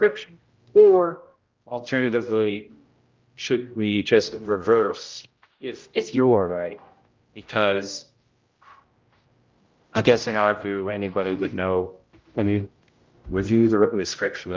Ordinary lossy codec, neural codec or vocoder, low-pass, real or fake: Opus, 24 kbps; codec, 16 kHz, 0.5 kbps, X-Codec, HuBERT features, trained on general audio; 7.2 kHz; fake